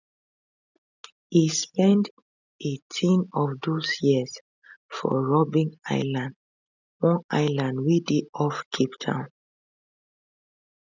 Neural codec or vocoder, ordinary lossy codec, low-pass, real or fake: none; none; 7.2 kHz; real